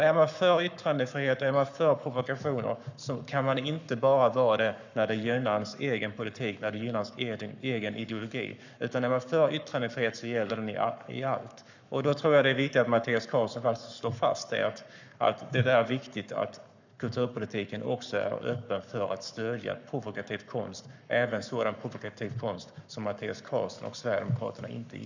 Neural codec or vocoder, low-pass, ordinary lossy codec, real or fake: codec, 44.1 kHz, 7.8 kbps, Pupu-Codec; 7.2 kHz; none; fake